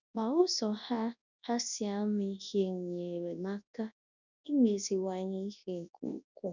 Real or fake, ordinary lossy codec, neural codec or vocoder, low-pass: fake; none; codec, 24 kHz, 0.9 kbps, WavTokenizer, large speech release; 7.2 kHz